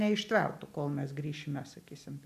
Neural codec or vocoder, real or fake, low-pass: none; real; 14.4 kHz